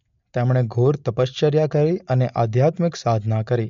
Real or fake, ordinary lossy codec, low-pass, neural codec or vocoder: real; MP3, 48 kbps; 7.2 kHz; none